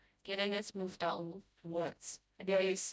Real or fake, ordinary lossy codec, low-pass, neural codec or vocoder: fake; none; none; codec, 16 kHz, 0.5 kbps, FreqCodec, smaller model